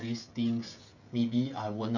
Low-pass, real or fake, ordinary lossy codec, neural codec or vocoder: 7.2 kHz; fake; none; codec, 16 kHz, 16 kbps, FreqCodec, smaller model